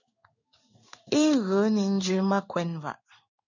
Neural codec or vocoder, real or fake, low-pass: codec, 16 kHz in and 24 kHz out, 1 kbps, XY-Tokenizer; fake; 7.2 kHz